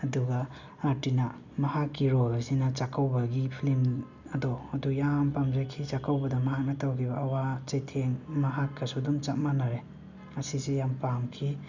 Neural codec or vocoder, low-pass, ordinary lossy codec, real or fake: none; 7.2 kHz; none; real